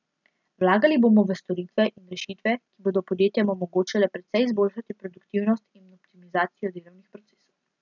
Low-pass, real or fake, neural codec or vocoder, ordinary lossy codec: 7.2 kHz; real; none; none